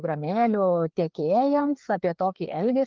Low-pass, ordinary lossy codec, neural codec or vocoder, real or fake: 7.2 kHz; Opus, 24 kbps; codec, 16 kHz, 2 kbps, FreqCodec, larger model; fake